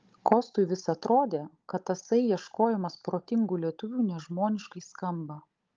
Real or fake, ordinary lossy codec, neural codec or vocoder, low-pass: fake; Opus, 24 kbps; codec, 16 kHz, 16 kbps, FunCodec, trained on Chinese and English, 50 frames a second; 7.2 kHz